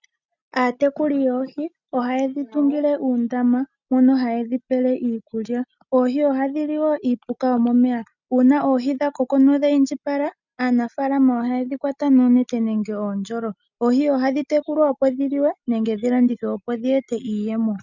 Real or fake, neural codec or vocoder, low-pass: real; none; 7.2 kHz